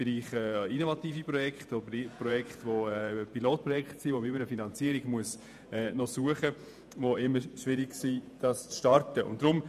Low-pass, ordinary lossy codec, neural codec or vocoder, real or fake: 14.4 kHz; none; vocoder, 48 kHz, 128 mel bands, Vocos; fake